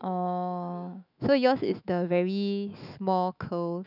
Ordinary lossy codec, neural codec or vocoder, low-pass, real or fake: none; autoencoder, 48 kHz, 128 numbers a frame, DAC-VAE, trained on Japanese speech; 5.4 kHz; fake